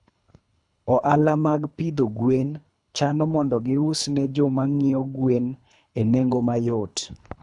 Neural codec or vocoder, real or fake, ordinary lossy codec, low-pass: codec, 24 kHz, 3 kbps, HILCodec; fake; none; 10.8 kHz